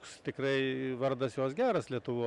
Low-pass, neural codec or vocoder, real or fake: 10.8 kHz; vocoder, 44.1 kHz, 128 mel bands every 256 samples, BigVGAN v2; fake